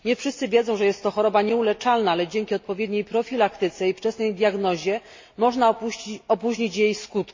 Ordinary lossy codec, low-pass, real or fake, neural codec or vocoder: MP3, 32 kbps; 7.2 kHz; real; none